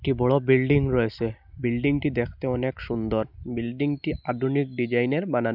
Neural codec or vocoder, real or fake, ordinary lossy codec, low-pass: none; real; none; 5.4 kHz